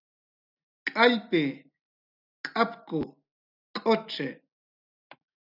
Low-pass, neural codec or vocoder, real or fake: 5.4 kHz; none; real